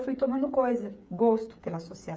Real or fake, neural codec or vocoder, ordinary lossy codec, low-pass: fake; codec, 16 kHz, 8 kbps, FreqCodec, smaller model; none; none